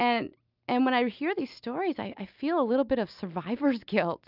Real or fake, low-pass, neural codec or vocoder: real; 5.4 kHz; none